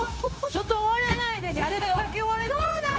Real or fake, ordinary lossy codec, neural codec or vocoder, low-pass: fake; none; codec, 16 kHz, 0.9 kbps, LongCat-Audio-Codec; none